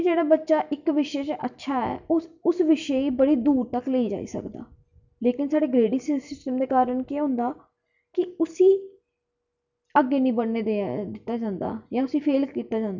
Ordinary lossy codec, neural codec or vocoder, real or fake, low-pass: none; none; real; 7.2 kHz